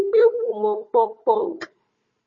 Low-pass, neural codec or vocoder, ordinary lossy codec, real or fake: 7.2 kHz; codec, 16 kHz, 4 kbps, FunCodec, trained on Chinese and English, 50 frames a second; MP3, 32 kbps; fake